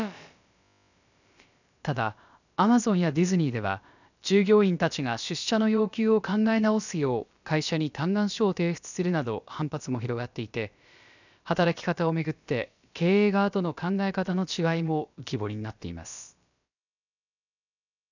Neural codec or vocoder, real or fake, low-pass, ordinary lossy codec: codec, 16 kHz, about 1 kbps, DyCAST, with the encoder's durations; fake; 7.2 kHz; none